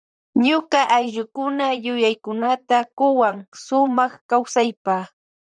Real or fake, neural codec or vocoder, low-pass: fake; vocoder, 44.1 kHz, 128 mel bands, Pupu-Vocoder; 9.9 kHz